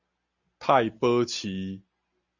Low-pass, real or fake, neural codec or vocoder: 7.2 kHz; real; none